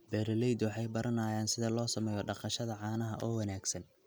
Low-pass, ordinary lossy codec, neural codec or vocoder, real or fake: none; none; none; real